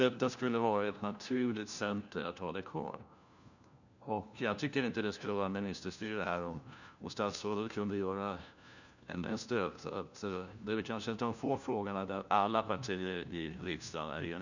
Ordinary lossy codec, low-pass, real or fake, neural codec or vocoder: none; 7.2 kHz; fake; codec, 16 kHz, 1 kbps, FunCodec, trained on LibriTTS, 50 frames a second